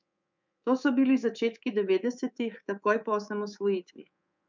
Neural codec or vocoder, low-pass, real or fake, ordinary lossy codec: codec, 24 kHz, 3.1 kbps, DualCodec; 7.2 kHz; fake; MP3, 64 kbps